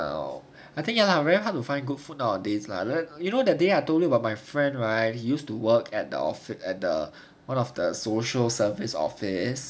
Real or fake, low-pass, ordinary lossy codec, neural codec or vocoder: real; none; none; none